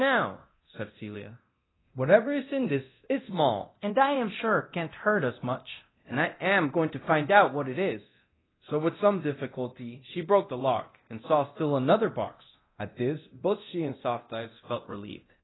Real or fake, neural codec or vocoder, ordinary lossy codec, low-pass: fake; codec, 24 kHz, 0.9 kbps, DualCodec; AAC, 16 kbps; 7.2 kHz